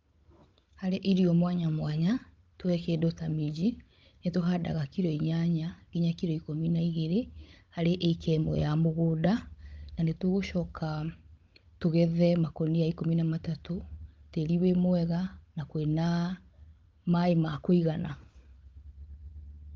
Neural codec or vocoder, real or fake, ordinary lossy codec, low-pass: codec, 16 kHz, 16 kbps, FunCodec, trained on Chinese and English, 50 frames a second; fake; Opus, 24 kbps; 7.2 kHz